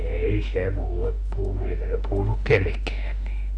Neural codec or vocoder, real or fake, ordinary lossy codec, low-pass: autoencoder, 48 kHz, 32 numbers a frame, DAC-VAE, trained on Japanese speech; fake; Opus, 64 kbps; 9.9 kHz